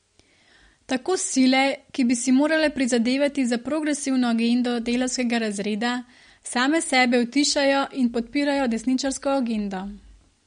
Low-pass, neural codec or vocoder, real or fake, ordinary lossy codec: 9.9 kHz; none; real; MP3, 48 kbps